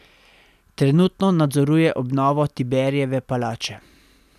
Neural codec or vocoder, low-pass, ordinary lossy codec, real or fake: none; 14.4 kHz; none; real